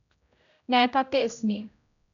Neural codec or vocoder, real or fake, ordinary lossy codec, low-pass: codec, 16 kHz, 0.5 kbps, X-Codec, HuBERT features, trained on general audio; fake; none; 7.2 kHz